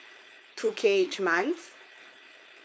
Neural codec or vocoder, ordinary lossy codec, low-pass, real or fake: codec, 16 kHz, 4.8 kbps, FACodec; none; none; fake